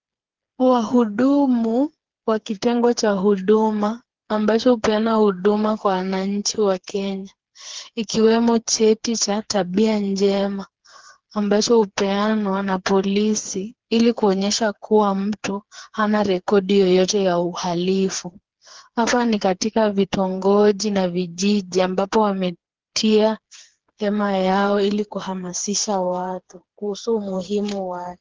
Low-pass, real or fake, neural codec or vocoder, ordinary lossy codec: 7.2 kHz; fake; codec, 16 kHz, 4 kbps, FreqCodec, smaller model; Opus, 16 kbps